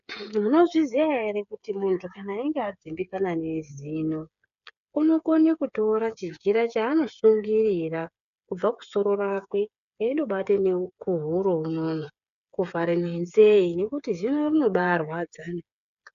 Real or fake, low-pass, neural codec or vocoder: fake; 7.2 kHz; codec, 16 kHz, 8 kbps, FreqCodec, smaller model